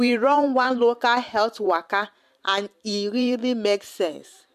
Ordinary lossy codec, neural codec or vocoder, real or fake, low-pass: MP3, 96 kbps; vocoder, 44.1 kHz, 128 mel bands every 512 samples, BigVGAN v2; fake; 14.4 kHz